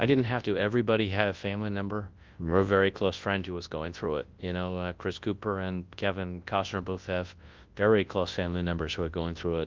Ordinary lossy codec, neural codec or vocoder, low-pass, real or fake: Opus, 32 kbps; codec, 24 kHz, 0.9 kbps, WavTokenizer, large speech release; 7.2 kHz; fake